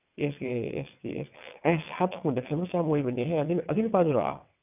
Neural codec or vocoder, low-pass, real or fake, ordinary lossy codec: vocoder, 22.05 kHz, 80 mel bands, WaveNeXt; 3.6 kHz; fake; none